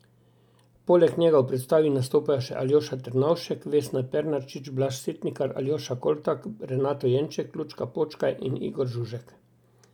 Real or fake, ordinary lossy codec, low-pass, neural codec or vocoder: real; none; 19.8 kHz; none